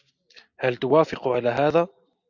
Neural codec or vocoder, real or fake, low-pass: none; real; 7.2 kHz